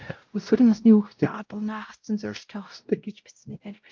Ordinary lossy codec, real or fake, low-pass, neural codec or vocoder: Opus, 24 kbps; fake; 7.2 kHz; codec, 16 kHz, 0.5 kbps, X-Codec, WavLM features, trained on Multilingual LibriSpeech